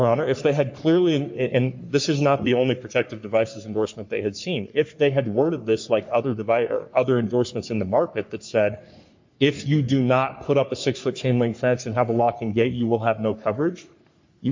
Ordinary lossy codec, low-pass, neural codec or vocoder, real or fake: MP3, 48 kbps; 7.2 kHz; codec, 44.1 kHz, 3.4 kbps, Pupu-Codec; fake